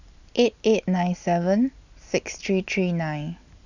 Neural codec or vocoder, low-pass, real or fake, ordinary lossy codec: none; 7.2 kHz; real; none